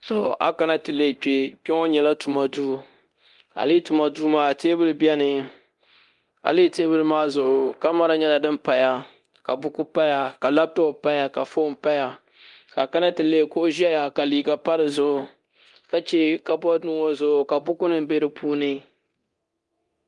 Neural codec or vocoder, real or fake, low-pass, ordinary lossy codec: codec, 24 kHz, 0.9 kbps, DualCodec; fake; 10.8 kHz; Opus, 32 kbps